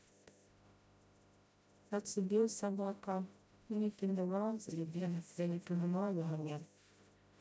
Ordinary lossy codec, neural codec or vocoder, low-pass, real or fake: none; codec, 16 kHz, 0.5 kbps, FreqCodec, smaller model; none; fake